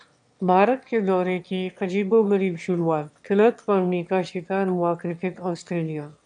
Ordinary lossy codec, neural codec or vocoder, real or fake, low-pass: AAC, 64 kbps; autoencoder, 22.05 kHz, a latent of 192 numbers a frame, VITS, trained on one speaker; fake; 9.9 kHz